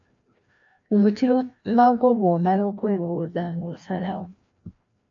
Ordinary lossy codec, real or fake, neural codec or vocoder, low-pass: AAC, 48 kbps; fake; codec, 16 kHz, 1 kbps, FreqCodec, larger model; 7.2 kHz